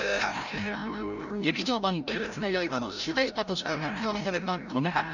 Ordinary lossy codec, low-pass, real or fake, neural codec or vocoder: none; 7.2 kHz; fake; codec, 16 kHz, 0.5 kbps, FreqCodec, larger model